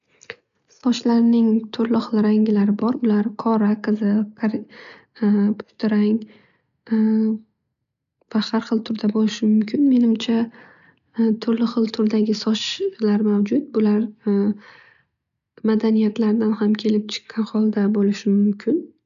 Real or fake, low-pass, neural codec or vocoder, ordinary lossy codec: real; 7.2 kHz; none; none